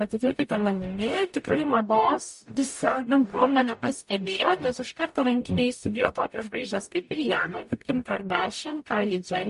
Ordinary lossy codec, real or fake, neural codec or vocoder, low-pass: MP3, 48 kbps; fake; codec, 44.1 kHz, 0.9 kbps, DAC; 14.4 kHz